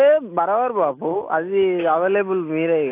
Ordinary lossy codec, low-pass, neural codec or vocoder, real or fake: AAC, 24 kbps; 3.6 kHz; none; real